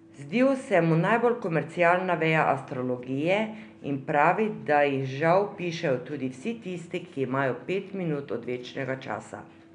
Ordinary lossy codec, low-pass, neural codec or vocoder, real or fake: none; 9.9 kHz; none; real